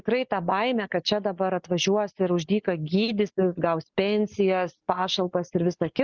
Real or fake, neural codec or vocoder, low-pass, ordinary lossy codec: real; none; 7.2 kHz; Opus, 64 kbps